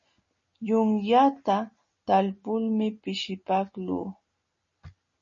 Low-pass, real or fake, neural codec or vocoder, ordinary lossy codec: 7.2 kHz; real; none; MP3, 32 kbps